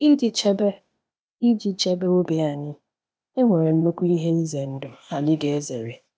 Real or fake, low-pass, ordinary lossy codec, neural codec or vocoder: fake; none; none; codec, 16 kHz, 0.8 kbps, ZipCodec